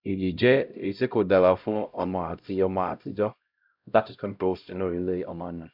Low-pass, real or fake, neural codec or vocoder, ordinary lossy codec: 5.4 kHz; fake; codec, 16 kHz, 0.5 kbps, X-Codec, HuBERT features, trained on LibriSpeech; none